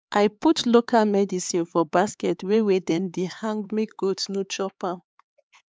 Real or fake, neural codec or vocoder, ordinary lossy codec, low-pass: fake; codec, 16 kHz, 4 kbps, X-Codec, HuBERT features, trained on LibriSpeech; none; none